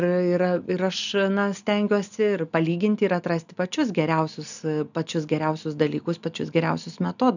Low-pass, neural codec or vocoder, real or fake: 7.2 kHz; none; real